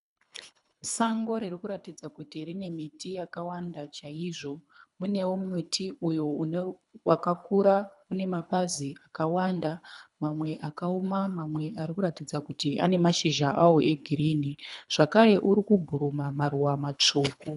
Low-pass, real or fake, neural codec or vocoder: 10.8 kHz; fake; codec, 24 kHz, 3 kbps, HILCodec